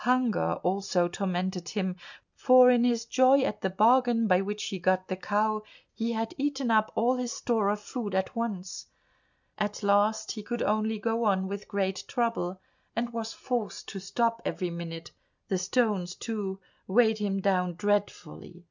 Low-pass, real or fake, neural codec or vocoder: 7.2 kHz; real; none